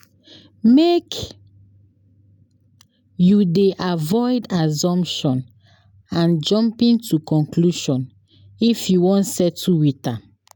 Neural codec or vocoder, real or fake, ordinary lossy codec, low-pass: none; real; none; 19.8 kHz